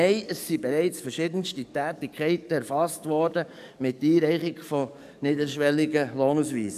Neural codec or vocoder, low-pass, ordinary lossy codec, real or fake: codec, 44.1 kHz, 7.8 kbps, DAC; 14.4 kHz; none; fake